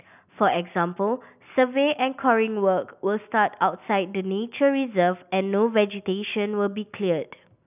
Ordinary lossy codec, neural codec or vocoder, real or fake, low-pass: none; none; real; 3.6 kHz